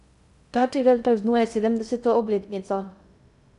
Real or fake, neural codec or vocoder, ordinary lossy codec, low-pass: fake; codec, 16 kHz in and 24 kHz out, 0.6 kbps, FocalCodec, streaming, 2048 codes; none; 10.8 kHz